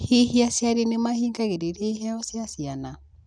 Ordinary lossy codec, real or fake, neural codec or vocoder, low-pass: none; real; none; 9.9 kHz